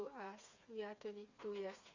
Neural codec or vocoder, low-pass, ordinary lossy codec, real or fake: codec, 16 kHz, 4 kbps, FreqCodec, smaller model; 7.2 kHz; Opus, 64 kbps; fake